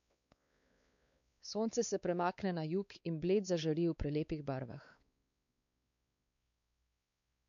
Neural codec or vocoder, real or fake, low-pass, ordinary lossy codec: codec, 16 kHz, 4 kbps, X-Codec, WavLM features, trained on Multilingual LibriSpeech; fake; 7.2 kHz; none